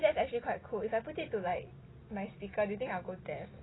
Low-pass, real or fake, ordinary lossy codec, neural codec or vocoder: 7.2 kHz; real; AAC, 16 kbps; none